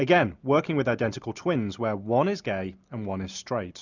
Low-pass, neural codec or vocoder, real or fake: 7.2 kHz; none; real